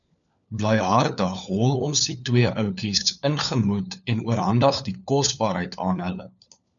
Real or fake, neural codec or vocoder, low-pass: fake; codec, 16 kHz, 4 kbps, FunCodec, trained on LibriTTS, 50 frames a second; 7.2 kHz